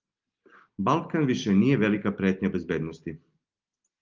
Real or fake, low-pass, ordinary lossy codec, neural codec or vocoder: real; 7.2 kHz; Opus, 24 kbps; none